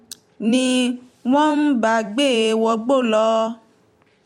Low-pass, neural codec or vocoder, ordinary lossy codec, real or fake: 19.8 kHz; vocoder, 44.1 kHz, 128 mel bands every 256 samples, BigVGAN v2; MP3, 64 kbps; fake